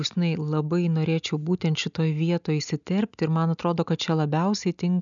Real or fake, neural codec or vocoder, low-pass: real; none; 7.2 kHz